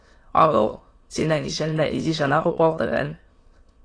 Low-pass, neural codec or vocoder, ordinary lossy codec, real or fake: 9.9 kHz; autoencoder, 22.05 kHz, a latent of 192 numbers a frame, VITS, trained on many speakers; AAC, 32 kbps; fake